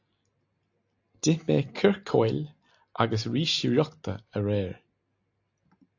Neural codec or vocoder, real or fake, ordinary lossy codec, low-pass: none; real; AAC, 48 kbps; 7.2 kHz